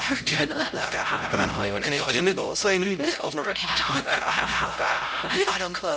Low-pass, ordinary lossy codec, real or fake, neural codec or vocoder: none; none; fake; codec, 16 kHz, 0.5 kbps, X-Codec, HuBERT features, trained on LibriSpeech